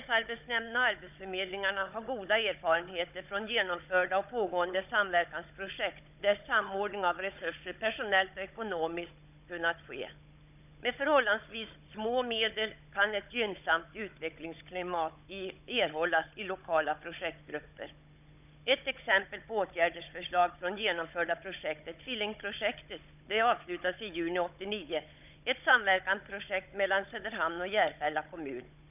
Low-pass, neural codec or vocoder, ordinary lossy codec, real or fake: 3.6 kHz; codec, 16 kHz, 16 kbps, FunCodec, trained on Chinese and English, 50 frames a second; none; fake